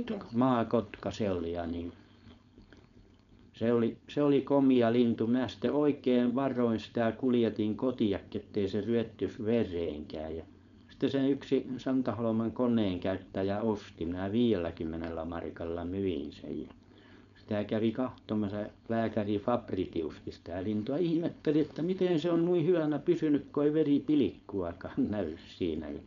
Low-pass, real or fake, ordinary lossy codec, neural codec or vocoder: 7.2 kHz; fake; none; codec, 16 kHz, 4.8 kbps, FACodec